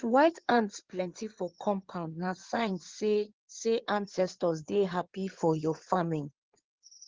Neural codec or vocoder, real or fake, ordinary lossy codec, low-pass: codec, 16 kHz in and 24 kHz out, 2.2 kbps, FireRedTTS-2 codec; fake; Opus, 16 kbps; 7.2 kHz